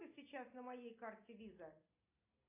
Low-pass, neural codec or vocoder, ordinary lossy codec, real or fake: 3.6 kHz; none; AAC, 24 kbps; real